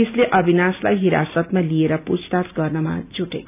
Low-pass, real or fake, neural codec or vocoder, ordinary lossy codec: 3.6 kHz; real; none; none